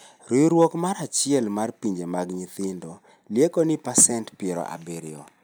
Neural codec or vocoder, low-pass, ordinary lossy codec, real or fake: none; none; none; real